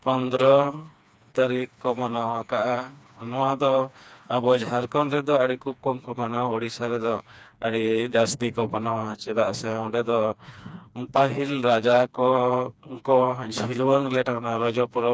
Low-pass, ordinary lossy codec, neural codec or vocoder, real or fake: none; none; codec, 16 kHz, 2 kbps, FreqCodec, smaller model; fake